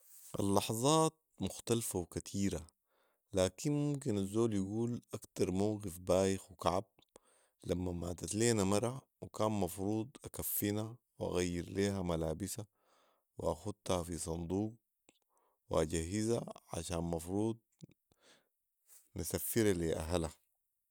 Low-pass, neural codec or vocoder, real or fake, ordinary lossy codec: none; none; real; none